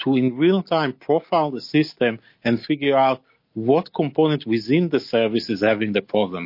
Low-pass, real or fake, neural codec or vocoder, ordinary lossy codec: 5.4 kHz; real; none; MP3, 32 kbps